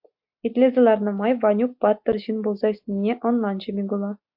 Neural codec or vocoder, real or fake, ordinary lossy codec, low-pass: none; real; AAC, 48 kbps; 5.4 kHz